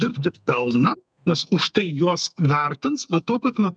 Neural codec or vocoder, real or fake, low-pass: codec, 32 kHz, 1.9 kbps, SNAC; fake; 9.9 kHz